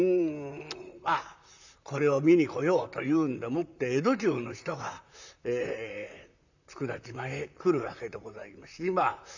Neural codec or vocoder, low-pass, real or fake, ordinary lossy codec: vocoder, 44.1 kHz, 128 mel bands, Pupu-Vocoder; 7.2 kHz; fake; none